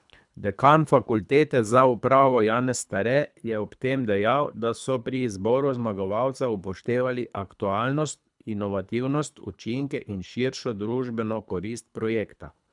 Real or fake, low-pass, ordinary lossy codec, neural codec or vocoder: fake; 10.8 kHz; none; codec, 24 kHz, 3 kbps, HILCodec